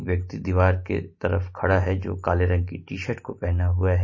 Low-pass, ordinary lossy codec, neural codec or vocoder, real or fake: 7.2 kHz; MP3, 32 kbps; none; real